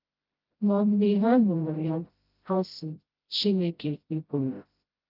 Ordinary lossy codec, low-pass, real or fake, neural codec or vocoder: Opus, 24 kbps; 5.4 kHz; fake; codec, 16 kHz, 0.5 kbps, FreqCodec, smaller model